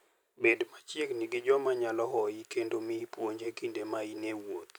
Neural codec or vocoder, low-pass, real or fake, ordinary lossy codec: vocoder, 44.1 kHz, 128 mel bands every 256 samples, BigVGAN v2; none; fake; none